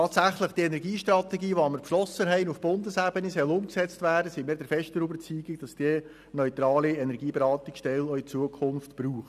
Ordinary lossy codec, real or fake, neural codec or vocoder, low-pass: none; real; none; 14.4 kHz